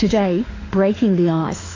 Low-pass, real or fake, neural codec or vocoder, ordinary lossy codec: 7.2 kHz; fake; autoencoder, 48 kHz, 32 numbers a frame, DAC-VAE, trained on Japanese speech; AAC, 32 kbps